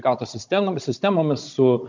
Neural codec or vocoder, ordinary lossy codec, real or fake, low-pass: codec, 16 kHz, 16 kbps, FunCodec, trained on Chinese and English, 50 frames a second; MP3, 64 kbps; fake; 7.2 kHz